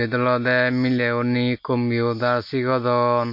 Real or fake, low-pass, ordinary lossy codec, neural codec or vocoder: real; 5.4 kHz; MP3, 32 kbps; none